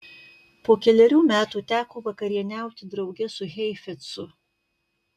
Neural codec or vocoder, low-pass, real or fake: none; 14.4 kHz; real